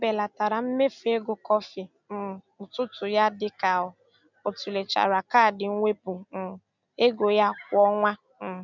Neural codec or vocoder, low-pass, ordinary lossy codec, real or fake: none; 7.2 kHz; none; real